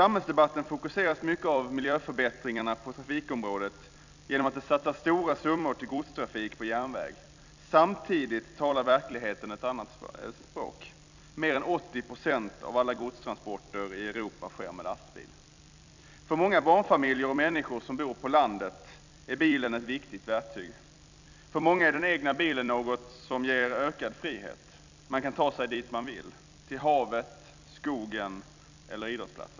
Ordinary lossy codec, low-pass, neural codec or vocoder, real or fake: none; 7.2 kHz; vocoder, 44.1 kHz, 128 mel bands every 256 samples, BigVGAN v2; fake